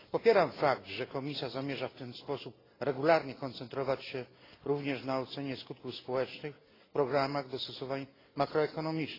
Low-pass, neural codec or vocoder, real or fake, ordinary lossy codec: 5.4 kHz; none; real; AAC, 24 kbps